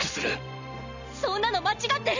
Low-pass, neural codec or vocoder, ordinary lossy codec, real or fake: 7.2 kHz; none; none; real